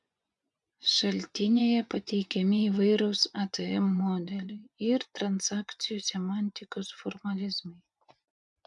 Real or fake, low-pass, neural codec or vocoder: fake; 10.8 kHz; vocoder, 24 kHz, 100 mel bands, Vocos